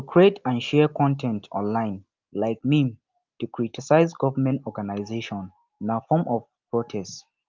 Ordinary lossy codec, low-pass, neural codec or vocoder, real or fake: Opus, 32 kbps; 7.2 kHz; none; real